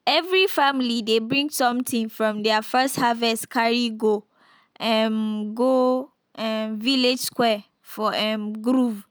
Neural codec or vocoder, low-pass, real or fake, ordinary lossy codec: none; none; real; none